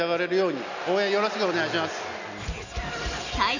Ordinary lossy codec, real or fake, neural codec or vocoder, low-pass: none; real; none; 7.2 kHz